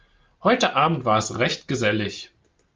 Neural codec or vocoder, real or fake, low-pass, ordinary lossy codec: none; real; 7.2 kHz; Opus, 24 kbps